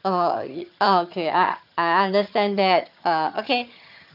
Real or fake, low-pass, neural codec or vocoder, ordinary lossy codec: fake; 5.4 kHz; vocoder, 22.05 kHz, 80 mel bands, HiFi-GAN; none